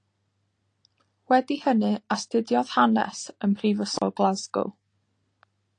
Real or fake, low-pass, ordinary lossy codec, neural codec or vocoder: real; 9.9 kHz; AAC, 48 kbps; none